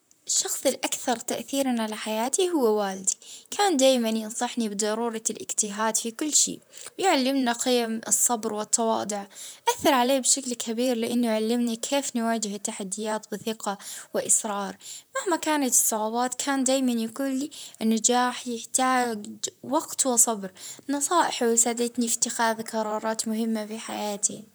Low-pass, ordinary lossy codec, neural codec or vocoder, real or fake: none; none; vocoder, 44.1 kHz, 128 mel bands, Pupu-Vocoder; fake